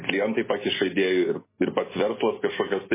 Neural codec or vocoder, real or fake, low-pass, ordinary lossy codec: vocoder, 44.1 kHz, 128 mel bands every 256 samples, BigVGAN v2; fake; 3.6 kHz; MP3, 16 kbps